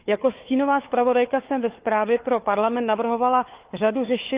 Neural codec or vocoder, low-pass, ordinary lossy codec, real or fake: codec, 16 kHz, 4 kbps, FunCodec, trained on Chinese and English, 50 frames a second; 3.6 kHz; Opus, 24 kbps; fake